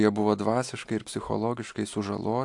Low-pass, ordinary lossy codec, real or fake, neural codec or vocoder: 10.8 kHz; AAC, 64 kbps; real; none